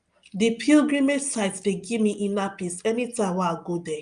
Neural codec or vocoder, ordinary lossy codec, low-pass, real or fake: none; Opus, 32 kbps; 9.9 kHz; real